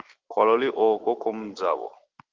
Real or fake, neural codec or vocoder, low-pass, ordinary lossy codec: fake; autoencoder, 48 kHz, 128 numbers a frame, DAC-VAE, trained on Japanese speech; 7.2 kHz; Opus, 16 kbps